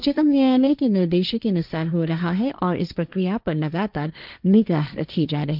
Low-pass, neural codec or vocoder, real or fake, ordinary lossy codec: 5.4 kHz; codec, 16 kHz, 1.1 kbps, Voila-Tokenizer; fake; none